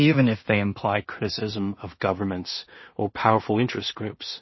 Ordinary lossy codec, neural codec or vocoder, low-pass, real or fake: MP3, 24 kbps; codec, 16 kHz in and 24 kHz out, 0.4 kbps, LongCat-Audio-Codec, two codebook decoder; 7.2 kHz; fake